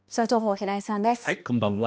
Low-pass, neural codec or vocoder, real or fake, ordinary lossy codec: none; codec, 16 kHz, 1 kbps, X-Codec, HuBERT features, trained on balanced general audio; fake; none